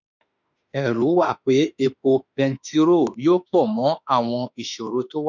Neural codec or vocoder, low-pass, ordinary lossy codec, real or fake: autoencoder, 48 kHz, 32 numbers a frame, DAC-VAE, trained on Japanese speech; 7.2 kHz; none; fake